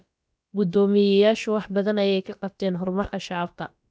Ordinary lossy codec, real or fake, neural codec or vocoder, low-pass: none; fake; codec, 16 kHz, about 1 kbps, DyCAST, with the encoder's durations; none